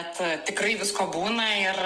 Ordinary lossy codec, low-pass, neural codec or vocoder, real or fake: Opus, 16 kbps; 10.8 kHz; none; real